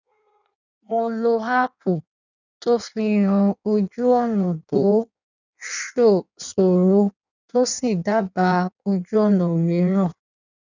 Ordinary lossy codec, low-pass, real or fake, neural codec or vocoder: none; 7.2 kHz; fake; codec, 16 kHz in and 24 kHz out, 1.1 kbps, FireRedTTS-2 codec